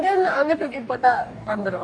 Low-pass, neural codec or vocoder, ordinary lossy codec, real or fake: 9.9 kHz; codec, 44.1 kHz, 2.6 kbps, DAC; none; fake